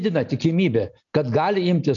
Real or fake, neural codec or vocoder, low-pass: real; none; 7.2 kHz